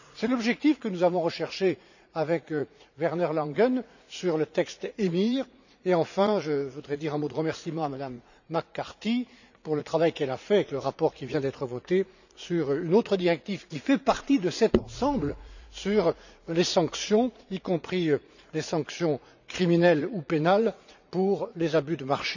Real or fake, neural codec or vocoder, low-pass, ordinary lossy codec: fake; vocoder, 44.1 kHz, 80 mel bands, Vocos; 7.2 kHz; none